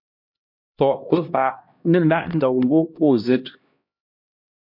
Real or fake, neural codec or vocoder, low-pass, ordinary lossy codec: fake; codec, 16 kHz, 1 kbps, X-Codec, HuBERT features, trained on LibriSpeech; 5.4 kHz; MP3, 48 kbps